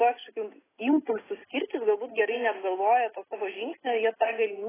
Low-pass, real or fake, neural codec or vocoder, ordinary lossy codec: 3.6 kHz; real; none; AAC, 16 kbps